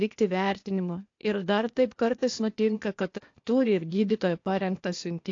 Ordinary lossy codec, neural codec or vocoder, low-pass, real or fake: AAC, 48 kbps; codec, 16 kHz, 0.8 kbps, ZipCodec; 7.2 kHz; fake